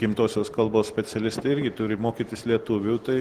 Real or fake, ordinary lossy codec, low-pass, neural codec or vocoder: real; Opus, 16 kbps; 14.4 kHz; none